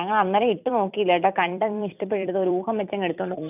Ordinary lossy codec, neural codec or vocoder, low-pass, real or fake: none; vocoder, 44.1 kHz, 128 mel bands every 256 samples, BigVGAN v2; 3.6 kHz; fake